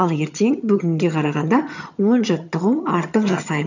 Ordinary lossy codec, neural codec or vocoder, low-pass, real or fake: none; vocoder, 22.05 kHz, 80 mel bands, HiFi-GAN; 7.2 kHz; fake